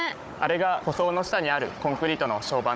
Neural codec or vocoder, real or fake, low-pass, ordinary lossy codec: codec, 16 kHz, 16 kbps, FunCodec, trained on Chinese and English, 50 frames a second; fake; none; none